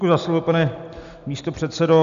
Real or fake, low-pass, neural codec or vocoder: real; 7.2 kHz; none